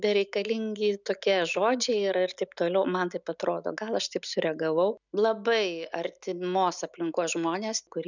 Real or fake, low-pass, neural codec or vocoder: real; 7.2 kHz; none